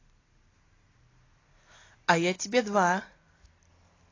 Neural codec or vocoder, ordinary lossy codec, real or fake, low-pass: none; AAC, 32 kbps; real; 7.2 kHz